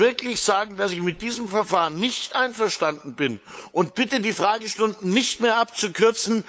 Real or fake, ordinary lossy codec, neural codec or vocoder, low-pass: fake; none; codec, 16 kHz, 8 kbps, FunCodec, trained on LibriTTS, 25 frames a second; none